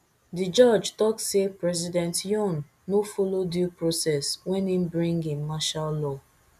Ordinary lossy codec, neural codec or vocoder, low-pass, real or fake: none; none; 14.4 kHz; real